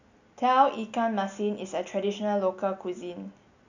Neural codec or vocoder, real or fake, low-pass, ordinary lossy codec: none; real; 7.2 kHz; none